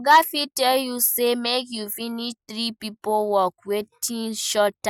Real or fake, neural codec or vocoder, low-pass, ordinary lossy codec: real; none; none; none